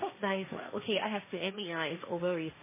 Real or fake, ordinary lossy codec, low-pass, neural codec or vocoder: fake; MP3, 16 kbps; 3.6 kHz; codec, 16 kHz, 1.1 kbps, Voila-Tokenizer